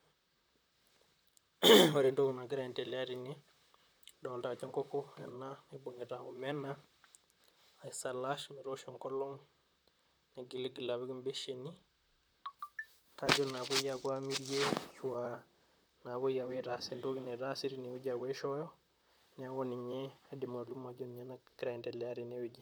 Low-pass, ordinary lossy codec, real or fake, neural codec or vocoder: none; none; fake; vocoder, 44.1 kHz, 128 mel bands, Pupu-Vocoder